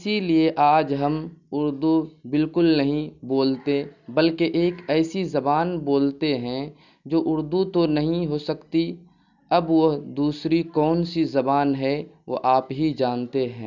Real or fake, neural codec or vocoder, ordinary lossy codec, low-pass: real; none; none; 7.2 kHz